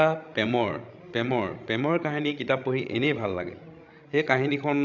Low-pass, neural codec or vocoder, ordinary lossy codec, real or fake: 7.2 kHz; codec, 16 kHz, 16 kbps, FreqCodec, larger model; none; fake